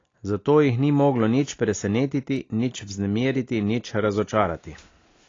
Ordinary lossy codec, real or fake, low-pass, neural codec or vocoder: AAC, 32 kbps; real; 7.2 kHz; none